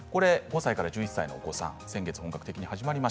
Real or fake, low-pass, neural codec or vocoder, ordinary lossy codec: real; none; none; none